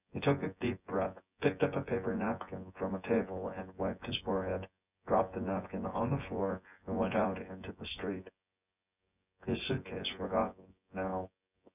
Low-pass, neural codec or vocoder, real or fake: 3.6 kHz; vocoder, 24 kHz, 100 mel bands, Vocos; fake